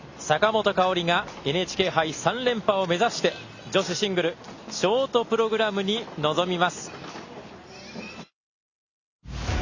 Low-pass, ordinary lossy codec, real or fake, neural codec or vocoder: 7.2 kHz; Opus, 64 kbps; real; none